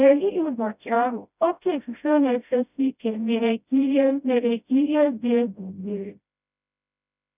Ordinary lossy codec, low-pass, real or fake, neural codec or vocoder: none; 3.6 kHz; fake; codec, 16 kHz, 0.5 kbps, FreqCodec, smaller model